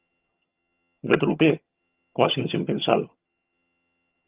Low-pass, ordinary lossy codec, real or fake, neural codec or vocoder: 3.6 kHz; Opus, 32 kbps; fake; vocoder, 22.05 kHz, 80 mel bands, HiFi-GAN